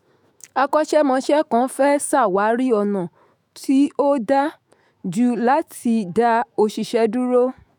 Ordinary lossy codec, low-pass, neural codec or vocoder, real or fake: none; none; autoencoder, 48 kHz, 128 numbers a frame, DAC-VAE, trained on Japanese speech; fake